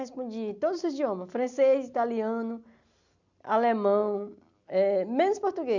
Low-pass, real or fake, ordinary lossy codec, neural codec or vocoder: 7.2 kHz; real; none; none